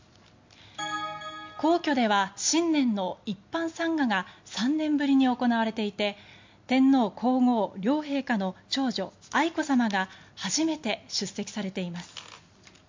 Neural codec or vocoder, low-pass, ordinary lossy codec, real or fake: none; 7.2 kHz; MP3, 48 kbps; real